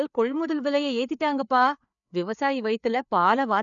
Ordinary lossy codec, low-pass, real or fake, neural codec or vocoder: none; 7.2 kHz; fake; codec, 16 kHz, 4 kbps, FreqCodec, larger model